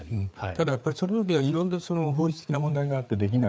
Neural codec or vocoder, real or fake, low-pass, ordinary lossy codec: codec, 16 kHz, 4 kbps, FreqCodec, larger model; fake; none; none